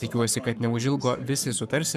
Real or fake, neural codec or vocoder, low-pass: fake; codec, 44.1 kHz, 7.8 kbps, Pupu-Codec; 14.4 kHz